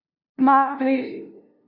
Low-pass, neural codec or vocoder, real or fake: 5.4 kHz; codec, 16 kHz, 0.5 kbps, FunCodec, trained on LibriTTS, 25 frames a second; fake